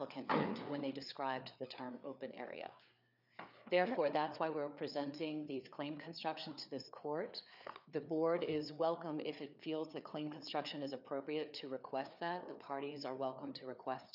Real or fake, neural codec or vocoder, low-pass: fake; codec, 16 kHz, 4 kbps, FreqCodec, larger model; 5.4 kHz